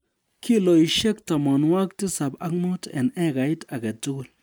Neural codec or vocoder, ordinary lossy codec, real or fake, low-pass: none; none; real; none